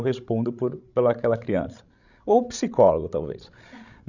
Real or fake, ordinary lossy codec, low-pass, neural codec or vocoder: fake; none; 7.2 kHz; codec, 16 kHz, 16 kbps, FreqCodec, larger model